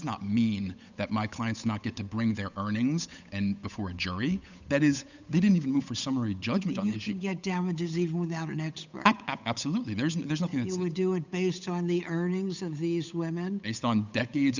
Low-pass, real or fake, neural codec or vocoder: 7.2 kHz; fake; codec, 16 kHz, 8 kbps, FunCodec, trained on Chinese and English, 25 frames a second